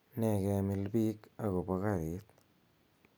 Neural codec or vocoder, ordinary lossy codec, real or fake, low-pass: none; none; real; none